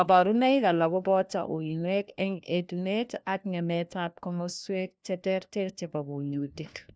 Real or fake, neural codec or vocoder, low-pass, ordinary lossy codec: fake; codec, 16 kHz, 1 kbps, FunCodec, trained on LibriTTS, 50 frames a second; none; none